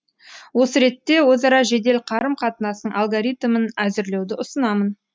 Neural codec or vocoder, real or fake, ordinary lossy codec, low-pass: none; real; none; none